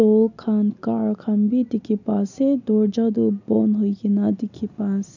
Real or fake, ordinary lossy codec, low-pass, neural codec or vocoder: real; none; 7.2 kHz; none